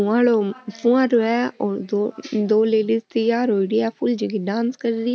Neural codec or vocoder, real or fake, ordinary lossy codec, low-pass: none; real; none; none